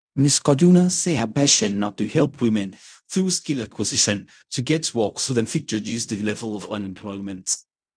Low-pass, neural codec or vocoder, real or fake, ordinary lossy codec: 9.9 kHz; codec, 16 kHz in and 24 kHz out, 0.4 kbps, LongCat-Audio-Codec, fine tuned four codebook decoder; fake; none